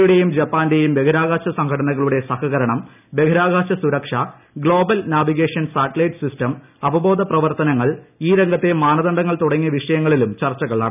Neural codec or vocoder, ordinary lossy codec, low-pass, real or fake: none; none; 3.6 kHz; real